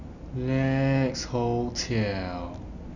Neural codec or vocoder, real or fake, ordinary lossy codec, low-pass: none; real; none; 7.2 kHz